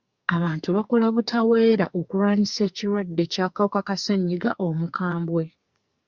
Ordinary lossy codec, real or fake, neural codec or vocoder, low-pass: Opus, 64 kbps; fake; codec, 44.1 kHz, 2.6 kbps, SNAC; 7.2 kHz